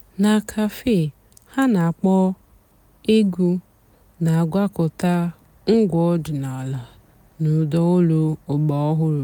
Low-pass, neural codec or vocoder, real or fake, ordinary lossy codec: 19.8 kHz; none; real; none